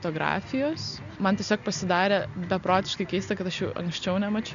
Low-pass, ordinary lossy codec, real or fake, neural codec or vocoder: 7.2 kHz; MP3, 96 kbps; real; none